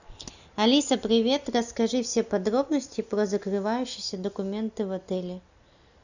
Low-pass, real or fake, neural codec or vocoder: 7.2 kHz; real; none